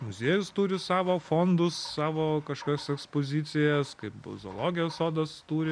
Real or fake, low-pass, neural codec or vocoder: real; 9.9 kHz; none